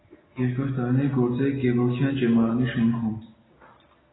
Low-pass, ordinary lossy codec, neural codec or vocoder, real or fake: 7.2 kHz; AAC, 16 kbps; none; real